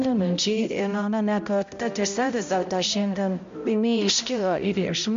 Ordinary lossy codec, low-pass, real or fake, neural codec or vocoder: MP3, 48 kbps; 7.2 kHz; fake; codec, 16 kHz, 0.5 kbps, X-Codec, HuBERT features, trained on balanced general audio